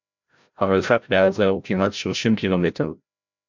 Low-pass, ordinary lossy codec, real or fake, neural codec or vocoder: 7.2 kHz; MP3, 64 kbps; fake; codec, 16 kHz, 0.5 kbps, FreqCodec, larger model